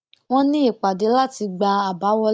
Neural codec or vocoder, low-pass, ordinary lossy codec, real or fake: none; none; none; real